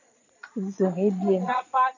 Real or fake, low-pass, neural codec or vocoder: fake; 7.2 kHz; vocoder, 22.05 kHz, 80 mel bands, Vocos